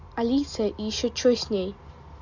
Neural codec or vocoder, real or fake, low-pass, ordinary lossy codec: none; real; 7.2 kHz; none